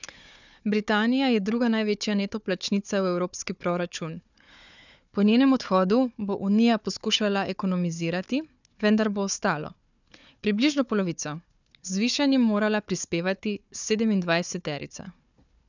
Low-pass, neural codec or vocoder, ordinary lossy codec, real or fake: 7.2 kHz; codec, 16 kHz, 4 kbps, FunCodec, trained on Chinese and English, 50 frames a second; none; fake